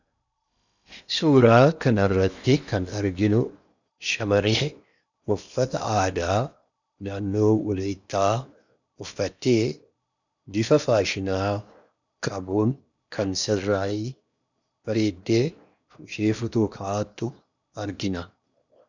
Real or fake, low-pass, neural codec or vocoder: fake; 7.2 kHz; codec, 16 kHz in and 24 kHz out, 0.8 kbps, FocalCodec, streaming, 65536 codes